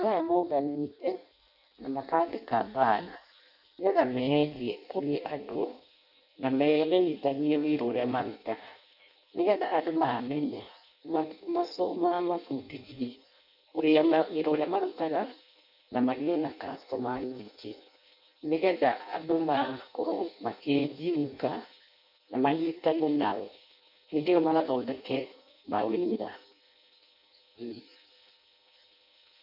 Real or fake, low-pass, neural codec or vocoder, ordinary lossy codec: fake; 5.4 kHz; codec, 16 kHz in and 24 kHz out, 0.6 kbps, FireRedTTS-2 codec; none